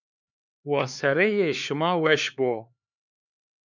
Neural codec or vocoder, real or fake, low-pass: codec, 16 kHz, 4 kbps, X-Codec, HuBERT features, trained on LibriSpeech; fake; 7.2 kHz